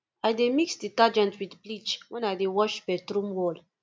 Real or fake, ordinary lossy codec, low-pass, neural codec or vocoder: real; none; none; none